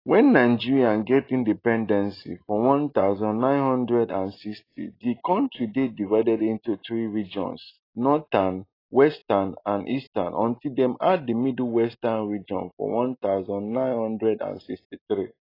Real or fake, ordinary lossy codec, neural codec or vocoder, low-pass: real; AAC, 24 kbps; none; 5.4 kHz